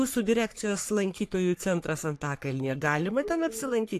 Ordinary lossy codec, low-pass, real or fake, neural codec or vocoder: AAC, 64 kbps; 14.4 kHz; fake; codec, 44.1 kHz, 3.4 kbps, Pupu-Codec